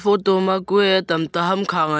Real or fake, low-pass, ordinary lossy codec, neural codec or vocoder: real; none; none; none